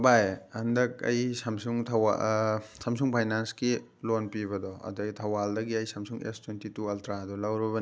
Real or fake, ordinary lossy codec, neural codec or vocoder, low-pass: real; none; none; none